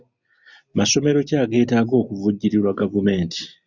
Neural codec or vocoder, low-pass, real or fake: none; 7.2 kHz; real